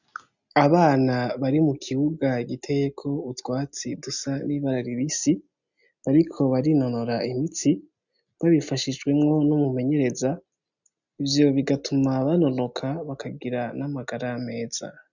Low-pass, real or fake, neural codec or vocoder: 7.2 kHz; real; none